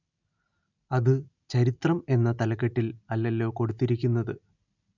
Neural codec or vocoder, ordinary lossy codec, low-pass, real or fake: none; none; 7.2 kHz; real